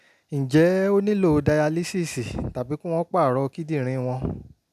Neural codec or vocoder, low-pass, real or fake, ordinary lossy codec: none; 14.4 kHz; real; none